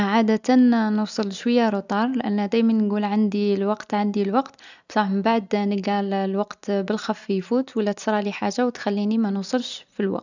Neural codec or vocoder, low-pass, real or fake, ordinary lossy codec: none; 7.2 kHz; real; none